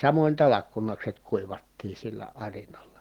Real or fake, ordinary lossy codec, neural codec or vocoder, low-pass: real; Opus, 16 kbps; none; 19.8 kHz